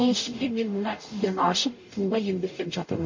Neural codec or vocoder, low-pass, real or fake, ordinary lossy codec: codec, 44.1 kHz, 0.9 kbps, DAC; 7.2 kHz; fake; MP3, 32 kbps